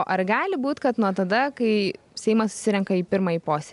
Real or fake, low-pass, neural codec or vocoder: real; 10.8 kHz; none